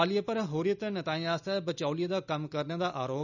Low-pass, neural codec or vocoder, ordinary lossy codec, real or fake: 7.2 kHz; none; none; real